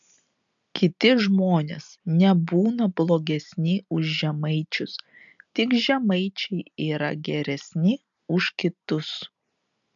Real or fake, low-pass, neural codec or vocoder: real; 7.2 kHz; none